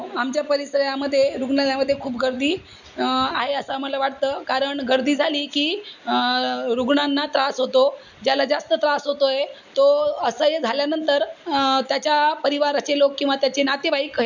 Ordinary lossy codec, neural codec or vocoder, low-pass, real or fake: none; none; 7.2 kHz; real